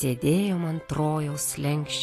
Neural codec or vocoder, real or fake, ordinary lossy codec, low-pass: none; real; AAC, 48 kbps; 14.4 kHz